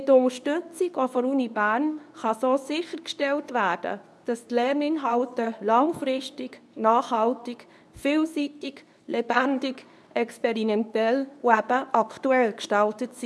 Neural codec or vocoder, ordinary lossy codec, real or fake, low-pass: codec, 24 kHz, 0.9 kbps, WavTokenizer, medium speech release version 2; none; fake; none